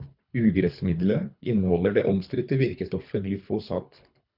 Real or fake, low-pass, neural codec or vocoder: fake; 5.4 kHz; codec, 24 kHz, 3 kbps, HILCodec